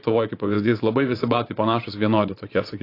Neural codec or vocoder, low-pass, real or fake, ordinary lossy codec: vocoder, 24 kHz, 100 mel bands, Vocos; 5.4 kHz; fake; AAC, 32 kbps